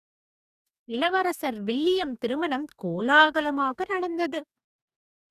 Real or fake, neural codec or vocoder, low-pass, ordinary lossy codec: fake; codec, 44.1 kHz, 2.6 kbps, DAC; 14.4 kHz; none